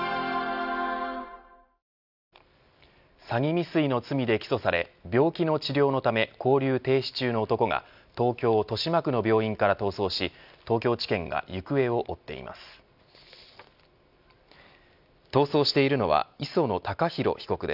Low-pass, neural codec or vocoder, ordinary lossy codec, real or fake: 5.4 kHz; none; none; real